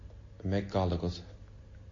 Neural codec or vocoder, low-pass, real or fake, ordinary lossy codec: none; 7.2 kHz; real; AAC, 32 kbps